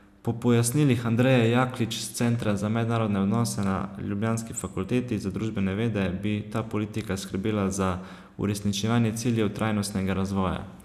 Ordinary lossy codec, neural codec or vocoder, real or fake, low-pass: none; none; real; 14.4 kHz